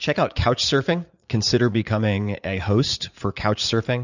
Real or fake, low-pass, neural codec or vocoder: fake; 7.2 kHz; vocoder, 44.1 kHz, 80 mel bands, Vocos